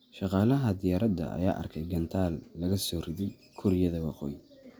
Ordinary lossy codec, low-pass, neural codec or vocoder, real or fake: none; none; none; real